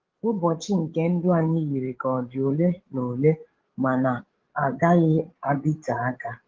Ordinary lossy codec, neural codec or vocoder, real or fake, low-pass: Opus, 16 kbps; codec, 16 kHz, 16 kbps, FreqCodec, larger model; fake; 7.2 kHz